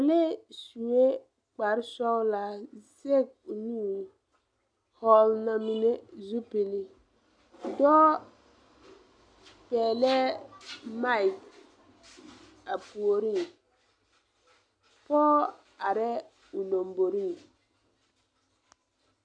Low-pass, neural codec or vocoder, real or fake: 9.9 kHz; none; real